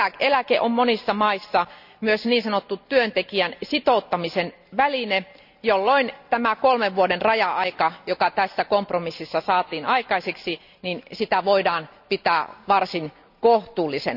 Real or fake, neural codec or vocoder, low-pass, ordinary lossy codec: real; none; 5.4 kHz; none